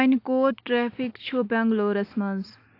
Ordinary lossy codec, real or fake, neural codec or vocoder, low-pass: AAC, 32 kbps; real; none; 5.4 kHz